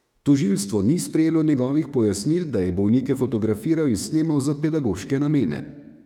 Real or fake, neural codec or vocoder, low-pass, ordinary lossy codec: fake; autoencoder, 48 kHz, 32 numbers a frame, DAC-VAE, trained on Japanese speech; 19.8 kHz; none